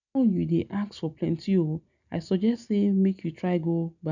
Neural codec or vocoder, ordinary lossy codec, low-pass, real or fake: none; none; 7.2 kHz; real